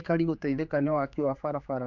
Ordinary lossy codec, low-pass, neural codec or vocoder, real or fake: none; 7.2 kHz; codec, 16 kHz, 4 kbps, X-Codec, HuBERT features, trained on general audio; fake